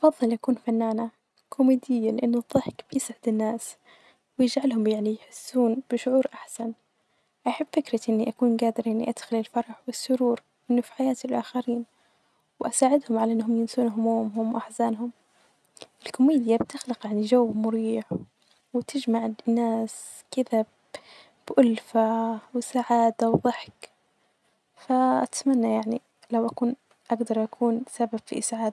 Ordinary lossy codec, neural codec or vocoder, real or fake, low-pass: none; none; real; none